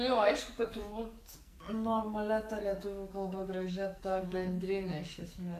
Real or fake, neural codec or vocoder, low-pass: fake; codec, 44.1 kHz, 2.6 kbps, SNAC; 14.4 kHz